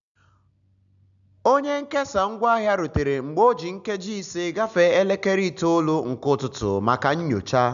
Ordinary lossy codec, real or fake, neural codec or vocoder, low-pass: none; real; none; 7.2 kHz